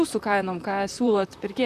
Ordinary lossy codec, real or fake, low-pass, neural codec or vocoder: MP3, 96 kbps; fake; 14.4 kHz; vocoder, 44.1 kHz, 128 mel bands, Pupu-Vocoder